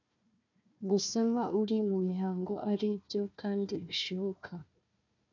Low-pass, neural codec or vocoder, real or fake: 7.2 kHz; codec, 16 kHz, 1 kbps, FunCodec, trained on Chinese and English, 50 frames a second; fake